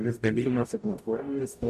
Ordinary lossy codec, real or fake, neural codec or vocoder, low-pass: MP3, 64 kbps; fake; codec, 44.1 kHz, 0.9 kbps, DAC; 14.4 kHz